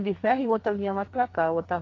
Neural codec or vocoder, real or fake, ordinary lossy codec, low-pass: codec, 44.1 kHz, 2.6 kbps, SNAC; fake; AAC, 32 kbps; 7.2 kHz